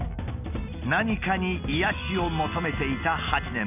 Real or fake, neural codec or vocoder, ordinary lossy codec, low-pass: real; none; none; 3.6 kHz